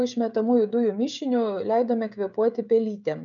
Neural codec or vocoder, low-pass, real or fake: codec, 16 kHz, 16 kbps, FreqCodec, smaller model; 7.2 kHz; fake